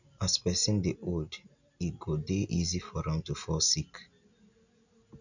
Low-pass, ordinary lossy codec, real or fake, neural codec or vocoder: 7.2 kHz; none; real; none